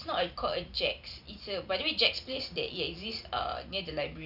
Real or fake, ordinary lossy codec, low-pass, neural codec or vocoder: real; none; 5.4 kHz; none